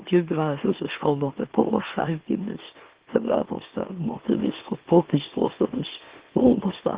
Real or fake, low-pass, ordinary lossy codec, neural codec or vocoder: fake; 3.6 kHz; Opus, 16 kbps; autoencoder, 44.1 kHz, a latent of 192 numbers a frame, MeloTTS